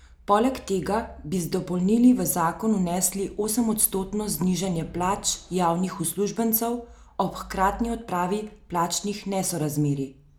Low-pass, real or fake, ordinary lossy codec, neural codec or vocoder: none; real; none; none